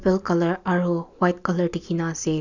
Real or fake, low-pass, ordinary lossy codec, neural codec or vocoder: real; 7.2 kHz; none; none